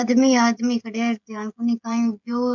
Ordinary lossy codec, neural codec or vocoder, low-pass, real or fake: MP3, 64 kbps; codec, 16 kHz, 16 kbps, FreqCodec, smaller model; 7.2 kHz; fake